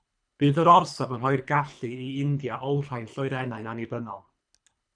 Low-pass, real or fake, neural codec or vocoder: 9.9 kHz; fake; codec, 24 kHz, 3 kbps, HILCodec